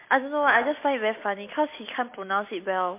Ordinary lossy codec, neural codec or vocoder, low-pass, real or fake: MP3, 24 kbps; none; 3.6 kHz; real